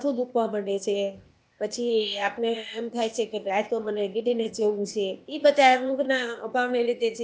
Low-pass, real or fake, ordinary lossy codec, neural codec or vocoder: none; fake; none; codec, 16 kHz, 0.8 kbps, ZipCodec